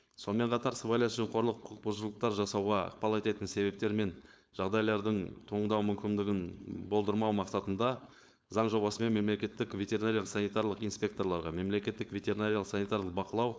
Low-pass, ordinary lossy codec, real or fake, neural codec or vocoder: none; none; fake; codec, 16 kHz, 4.8 kbps, FACodec